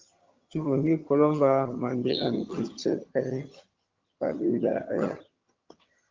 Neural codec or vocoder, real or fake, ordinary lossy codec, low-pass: vocoder, 22.05 kHz, 80 mel bands, HiFi-GAN; fake; Opus, 32 kbps; 7.2 kHz